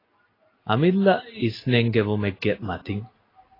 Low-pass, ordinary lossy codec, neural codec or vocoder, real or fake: 5.4 kHz; AAC, 24 kbps; none; real